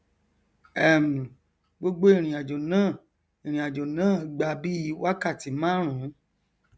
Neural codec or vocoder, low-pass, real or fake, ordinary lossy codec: none; none; real; none